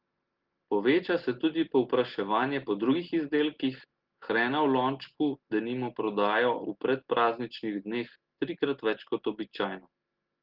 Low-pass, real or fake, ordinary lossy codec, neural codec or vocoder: 5.4 kHz; real; Opus, 16 kbps; none